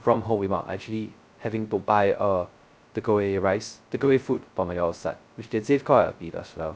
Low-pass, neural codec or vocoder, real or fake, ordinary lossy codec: none; codec, 16 kHz, 0.2 kbps, FocalCodec; fake; none